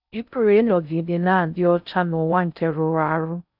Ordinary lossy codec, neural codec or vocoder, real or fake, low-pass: none; codec, 16 kHz in and 24 kHz out, 0.6 kbps, FocalCodec, streaming, 4096 codes; fake; 5.4 kHz